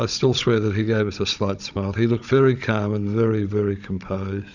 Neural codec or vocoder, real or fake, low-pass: codec, 16 kHz, 16 kbps, FunCodec, trained on Chinese and English, 50 frames a second; fake; 7.2 kHz